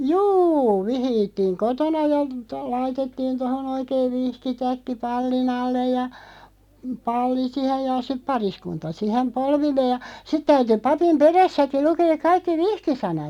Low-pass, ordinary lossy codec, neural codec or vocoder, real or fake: 19.8 kHz; none; none; real